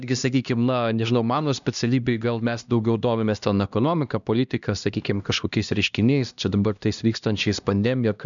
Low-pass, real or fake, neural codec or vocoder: 7.2 kHz; fake; codec, 16 kHz, 1 kbps, X-Codec, HuBERT features, trained on LibriSpeech